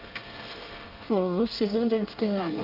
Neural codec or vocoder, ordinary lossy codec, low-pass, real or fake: codec, 24 kHz, 1 kbps, SNAC; Opus, 32 kbps; 5.4 kHz; fake